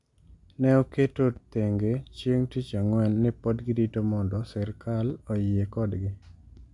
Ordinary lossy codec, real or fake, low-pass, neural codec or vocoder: MP3, 64 kbps; real; 10.8 kHz; none